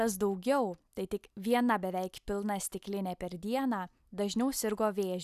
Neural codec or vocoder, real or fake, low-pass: none; real; 14.4 kHz